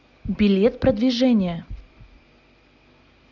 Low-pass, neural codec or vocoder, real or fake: 7.2 kHz; none; real